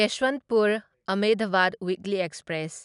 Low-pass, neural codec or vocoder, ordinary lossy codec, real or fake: 10.8 kHz; none; none; real